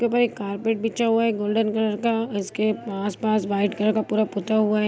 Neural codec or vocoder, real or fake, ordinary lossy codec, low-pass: none; real; none; none